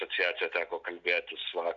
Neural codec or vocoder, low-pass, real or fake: none; 7.2 kHz; real